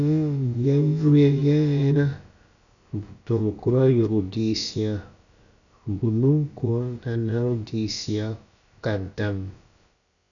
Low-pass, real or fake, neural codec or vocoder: 7.2 kHz; fake; codec, 16 kHz, about 1 kbps, DyCAST, with the encoder's durations